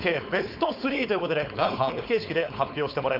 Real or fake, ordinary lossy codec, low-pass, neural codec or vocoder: fake; none; 5.4 kHz; codec, 16 kHz, 4.8 kbps, FACodec